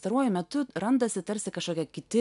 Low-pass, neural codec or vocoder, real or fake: 10.8 kHz; none; real